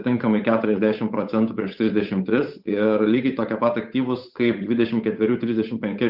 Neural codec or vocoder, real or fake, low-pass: codec, 16 kHz, 8 kbps, FunCodec, trained on Chinese and English, 25 frames a second; fake; 5.4 kHz